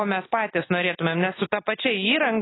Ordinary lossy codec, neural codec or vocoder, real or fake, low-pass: AAC, 16 kbps; none; real; 7.2 kHz